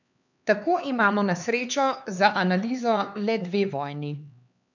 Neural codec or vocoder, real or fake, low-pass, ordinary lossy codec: codec, 16 kHz, 4 kbps, X-Codec, HuBERT features, trained on LibriSpeech; fake; 7.2 kHz; none